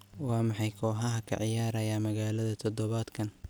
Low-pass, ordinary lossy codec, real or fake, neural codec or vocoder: none; none; real; none